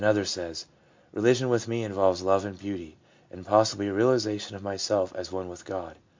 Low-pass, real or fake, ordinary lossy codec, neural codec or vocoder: 7.2 kHz; real; MP3, 64 kbps; none